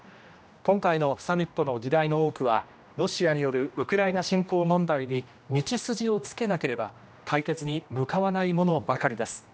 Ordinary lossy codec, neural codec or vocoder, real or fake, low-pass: none; codec, 16 kHz, 1 kbps, X-Codec, HuBERT features, trained on general audio; fake; none